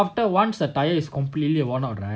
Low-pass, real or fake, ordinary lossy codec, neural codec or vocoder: none; real; none; none